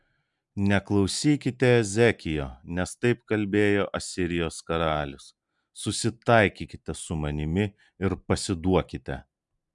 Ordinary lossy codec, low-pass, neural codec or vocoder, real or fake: MP3, 96 kbps; 10.8 kHz; none; real